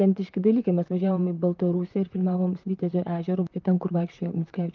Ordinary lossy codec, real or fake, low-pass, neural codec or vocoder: Opus, 32 kbps; fake; 7.2 kHz; vocoder, 44.1 kHz, 128 mel bands, Pupu-Vocoder